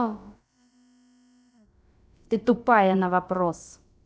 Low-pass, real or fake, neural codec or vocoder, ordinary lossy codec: none; fake; codec, 16 kHz, about 1 kbps, DyCAST, with the encoder's durations; none